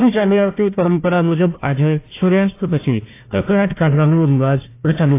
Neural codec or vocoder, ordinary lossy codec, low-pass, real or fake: codec, 16 kHz, 1 kbps, FunCodec, trained on Chinese and English, 50 frames a second; AAC, 24 kbps; 3.6 kHz; fake